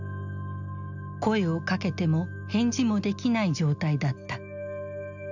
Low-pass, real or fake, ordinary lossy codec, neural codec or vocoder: 7.2 kHz; real; none; none